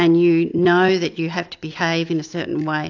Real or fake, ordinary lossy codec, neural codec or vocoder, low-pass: real; AAC, 48 kbps; none; 7.2 kHz